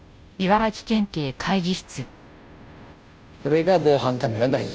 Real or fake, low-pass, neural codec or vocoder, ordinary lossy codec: fake; none; codec, 16 kHz, 0.5 kbps, FunCodec, trained on Chinese and English, 25 frames a second; none